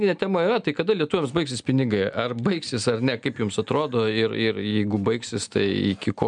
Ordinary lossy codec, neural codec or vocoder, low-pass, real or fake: MP3, 96 kbps; none; 9.9 kHz; real